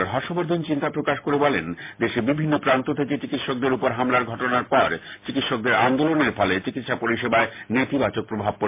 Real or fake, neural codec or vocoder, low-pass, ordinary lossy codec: real; none; 3.6 kHz; MP3, 24 kbps